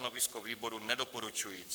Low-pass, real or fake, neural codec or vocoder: 19.8 kHz; fake; codec, 44.1 kHz, 7.8 kbps, Pupu-Codec